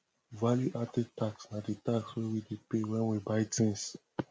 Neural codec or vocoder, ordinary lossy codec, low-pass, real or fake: none; none; none; real